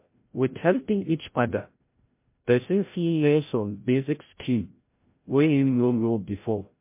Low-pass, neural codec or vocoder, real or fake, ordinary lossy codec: 3.6 kHz; codec, 16 kHz, 0.5 kbps, FreqCodec, larger model; fake; MP3, 24 kbps